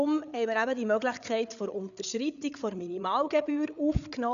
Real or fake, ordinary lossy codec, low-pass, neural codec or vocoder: fake; none; 7.2 kHz; codec, 16 kHz, 8 kbps, FreqCodec, larger model